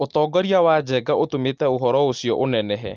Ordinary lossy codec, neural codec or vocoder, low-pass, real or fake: Opus, 24 kbps; none; 7.2 kHz; real